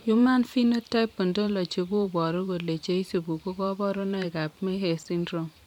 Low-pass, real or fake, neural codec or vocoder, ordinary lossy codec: 19.8 kHz; fake; vocoder, 44.1 kHz, 128 mel bands every 512 samples, BigVGAN v2; none